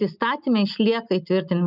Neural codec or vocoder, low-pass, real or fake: none; 5.4 kHz; real